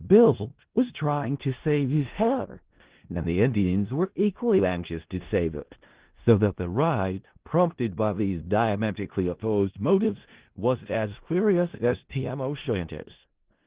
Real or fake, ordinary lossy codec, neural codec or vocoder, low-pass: fake; Opus, 16 kbps; codec, 16 kHz in and 24 kHz out, 0.4 kbps, LongCat-Audio-Codec, four codebook decoder; 3.6 kHz